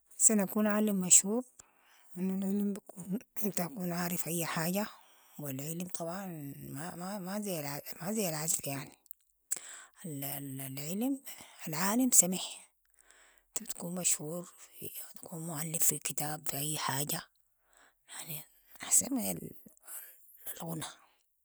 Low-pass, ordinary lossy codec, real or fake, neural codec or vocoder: none; none; real; none